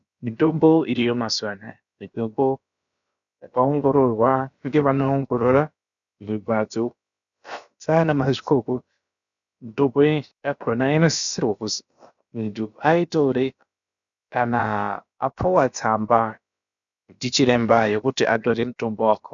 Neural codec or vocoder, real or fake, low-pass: codec, 16 kHz, about 1 kbps, DyCAST, with the encoder's durations; fake; 7.2 kHz